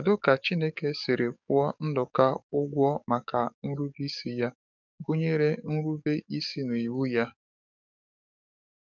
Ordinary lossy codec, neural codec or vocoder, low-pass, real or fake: none; codec, 44.1 kHz, 7.8 kbps, DAC; 7.2 kHz; fake